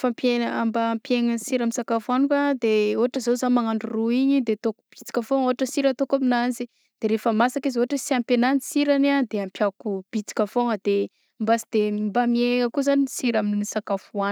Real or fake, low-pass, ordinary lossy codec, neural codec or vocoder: real; none; none; none